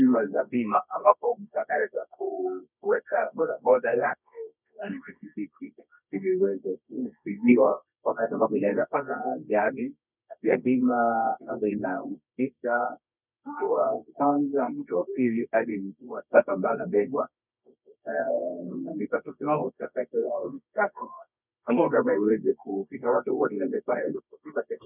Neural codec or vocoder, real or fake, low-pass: codec, 24 kHz, 0.9 kbps, WavTokenizer, medium music audio release; fake; 3.6 kHz